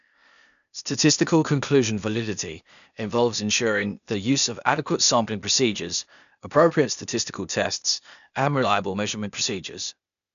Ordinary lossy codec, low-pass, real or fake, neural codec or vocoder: none; 7.2 kHz; fake; codec, 16 kHz, 0.8 kbps, ZipCodec